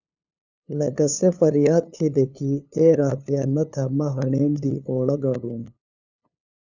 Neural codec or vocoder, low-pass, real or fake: codec, 16 kHz, 2 kbps, FunCodec, trained on LibriTTS, 25 frames a second; 7.2 kHz; fake